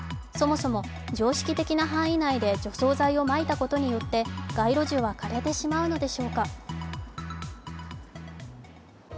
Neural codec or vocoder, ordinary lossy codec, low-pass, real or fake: none; none; none; real